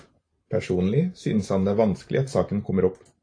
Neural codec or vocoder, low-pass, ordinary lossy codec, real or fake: vocoder, 44.1 kHz, 128 mel bands every 512 samples, BigVGAN v2; 9.9 kHz; AAC, 48 kbps; fake